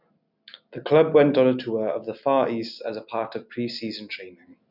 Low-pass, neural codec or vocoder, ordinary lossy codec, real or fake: 5.4 kHz; none; none; real